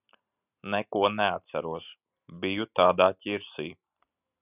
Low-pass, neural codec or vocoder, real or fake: 3.6 kHz; none; real